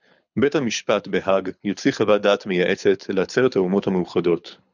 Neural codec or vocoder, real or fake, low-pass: codec, 24 kHz, 6 kbps, HILCodec; fake; 7.2 kHz